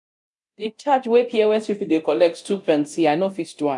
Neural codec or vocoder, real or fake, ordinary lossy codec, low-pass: codec, 24 kHz, 0.9 kbps, DualCodec; fake; MP3, 96 kbps; 10.8 kHz